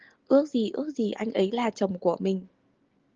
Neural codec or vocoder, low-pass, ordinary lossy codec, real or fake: none; 7.2 kHz; Opus, 16 kbps; real